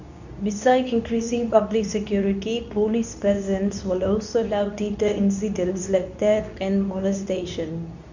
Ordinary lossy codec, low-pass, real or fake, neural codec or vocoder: none; 7.2 kHz; fake; codec, 24 kHz, 0.9 kbps, WavTokenizer, medium speech release version 2